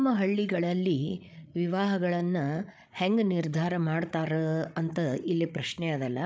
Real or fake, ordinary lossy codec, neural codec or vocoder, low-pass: fake; none; codec, 16 kHz, 8 kbps, FreqCodec, larger model; none